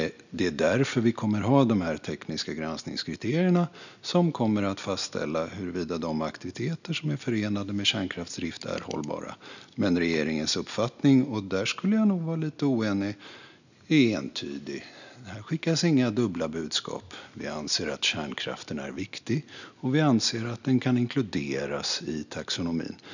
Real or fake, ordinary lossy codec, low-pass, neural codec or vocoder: real; none; 7.2 kHz; none